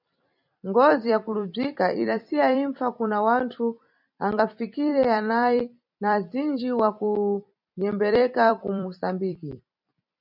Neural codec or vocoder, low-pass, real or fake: vocoder, 44.1 kHz, 128 mel bands every 256 samples, BigVGAN v2; 5.4 kHz; fake